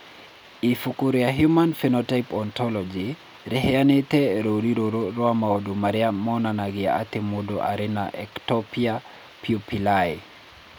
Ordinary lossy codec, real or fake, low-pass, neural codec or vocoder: none; real; none; none